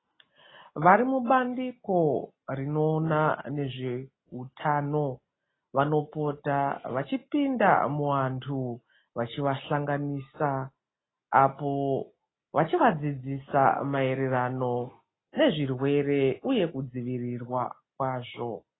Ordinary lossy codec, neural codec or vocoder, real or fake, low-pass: AAC, 16 kbps; none; real; 7.2 kHz